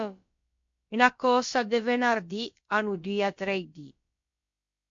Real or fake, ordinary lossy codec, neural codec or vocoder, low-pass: fake; MP3, 48 kbps; codec, 16 kHz, about 1 kbps, DyCAST, with the encoder's durations; 7.2 kHz